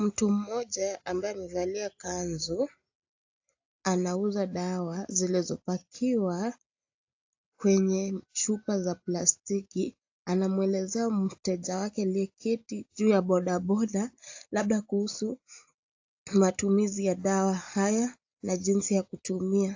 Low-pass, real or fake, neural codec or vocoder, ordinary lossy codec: 7.2 kHz; real; none; AAC, 48 kbps